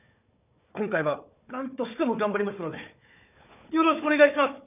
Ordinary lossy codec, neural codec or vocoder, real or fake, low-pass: none; codec, 16 kHz, 4 kbps, FunCodec, trained on Chinese and English, 50 frames a second; fake; 3.6 kHz